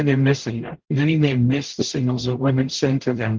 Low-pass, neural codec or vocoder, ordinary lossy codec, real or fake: 7.2 kHz; codec, 44.1 kHz, 0.9 kbps, DAC; Opus, 16 kbps; fake